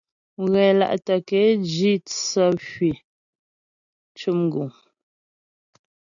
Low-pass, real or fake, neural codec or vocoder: 7.2 kHz; real; none